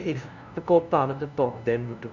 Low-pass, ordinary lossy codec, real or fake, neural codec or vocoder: 7.2 kHz; none; fake; codec, 16 kHz, 0.5 kbps, FunCodec, trained on LibriTTS, 25 frames a second